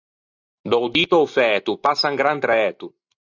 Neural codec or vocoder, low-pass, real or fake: none; 7.2 kHz; real